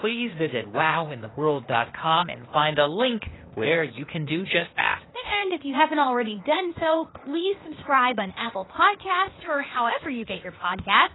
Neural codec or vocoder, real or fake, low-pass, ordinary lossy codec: codec, 16 kHz, 0.8 kbps, ZipCodec; fake; 7.2 kHz; AAC, 16 kbps